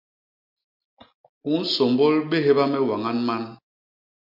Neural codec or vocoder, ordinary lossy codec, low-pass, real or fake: none; MP3, 48 kbps; 5.4 kHz; real